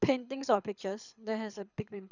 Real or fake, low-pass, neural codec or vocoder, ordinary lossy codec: fake; 7.2 kHz; codec, 24 kHz, 6 kbps, HILCodec; none